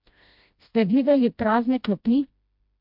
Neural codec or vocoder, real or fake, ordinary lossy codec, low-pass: codec, 16 kHz, 1 kbps, FreqCodec, smaller model; fake; MP3, 48 kbps; 5.4 kHz